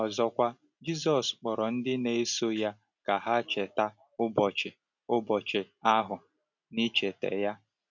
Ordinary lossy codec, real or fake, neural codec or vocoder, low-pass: none; real; none; 7.2 kHz